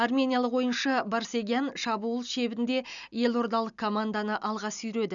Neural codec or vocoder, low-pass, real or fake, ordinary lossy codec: none; 7.2 kHz; real; none